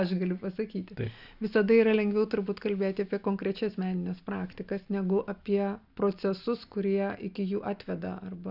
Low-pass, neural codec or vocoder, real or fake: 5.4 kHz; none; real